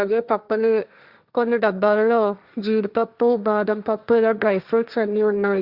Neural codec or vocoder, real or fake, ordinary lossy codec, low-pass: codec, 16 kHz, 1.1 kbps, Voila-Tokenizer; fake; Opus, 64 kbps; 5.4 kHz